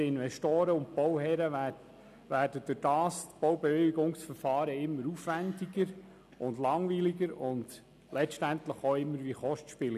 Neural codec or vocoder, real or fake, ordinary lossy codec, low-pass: none; real; none; 14.4 kHz